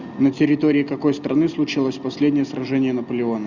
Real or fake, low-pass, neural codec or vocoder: real; 7.2 kHz; none